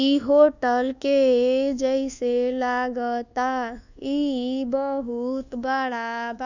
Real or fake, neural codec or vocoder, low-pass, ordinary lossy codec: fake; codec, 24 kHz, 1.2 kbps, DualCodec; 7.2 kHz; none